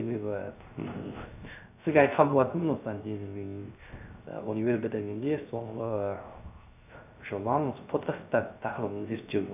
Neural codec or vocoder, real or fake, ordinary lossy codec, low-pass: codec, 16 kHz, 0.3 kbps, FocalCodec; fake; none; 3.6 kHz